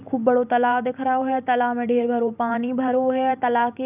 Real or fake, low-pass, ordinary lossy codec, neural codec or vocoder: fake; 3.6 kHz; none; vocoder, 44.1 kHz, 128 mel bands every 512 samples, BigVGAN v2